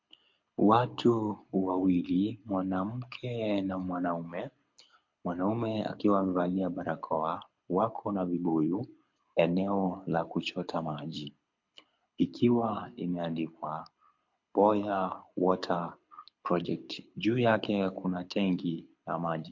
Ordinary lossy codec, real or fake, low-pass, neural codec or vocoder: MP3, 48 kbps; fake; 7.2 kHz; codec, 24 kHz, 6 kbps, HILCodec